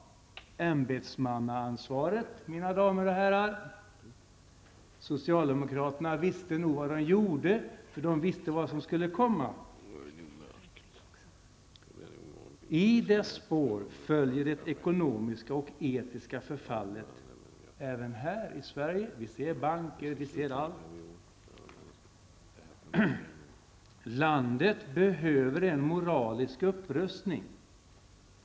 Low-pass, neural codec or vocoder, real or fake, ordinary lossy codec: none; none; real; none